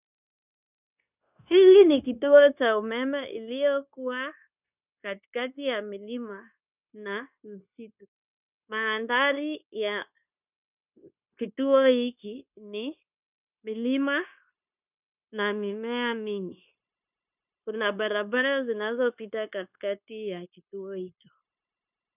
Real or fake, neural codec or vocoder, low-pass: fake; codec, 16 kHz, 0.9 kbps, LongCat-Audio-Codec; 3.6 kHz